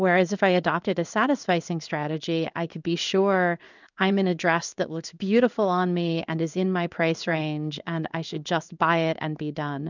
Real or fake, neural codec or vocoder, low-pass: fake; codec, 16 kHz in and 24 kHz out, 1 kbps, XY-Tokenizer; 7.2 kHz